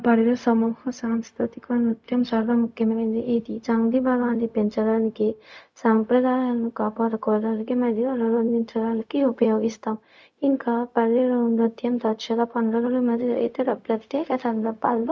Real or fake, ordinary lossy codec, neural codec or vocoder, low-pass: fake; none; codec, 16 kHz, 0.4 kbps, LongCat-Audio-Codec; none